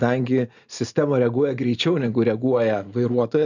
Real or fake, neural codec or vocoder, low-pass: real; none; 7.2 kHz